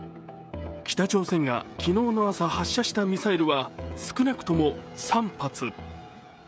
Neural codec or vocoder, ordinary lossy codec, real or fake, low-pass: codec, 16 kHz, 16 kbps, FreqCodec, smaller model; none; fake; none